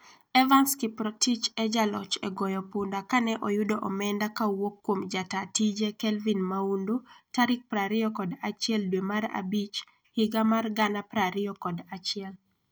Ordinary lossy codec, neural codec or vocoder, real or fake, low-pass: none; none; real; none